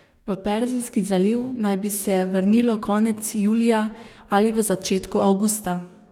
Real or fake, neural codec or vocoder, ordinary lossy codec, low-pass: fake; codec, 44.1 kHz, 2.6 kbps, DAC; none; 19.8 kHz